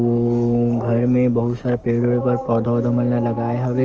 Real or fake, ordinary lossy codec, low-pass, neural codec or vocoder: fake; Opus, 24 kbps; 7.2 kHz; codec, 44.1 kHz, 7.8 kbps, DAC